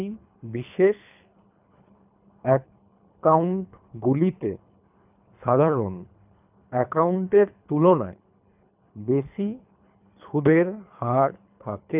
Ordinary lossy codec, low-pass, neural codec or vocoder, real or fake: none; 3.6 kHz; codec, 24 kHz, 3 kbps, HILCodec; fake